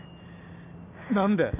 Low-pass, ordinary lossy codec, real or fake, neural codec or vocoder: 3.6 kHz; none; real; none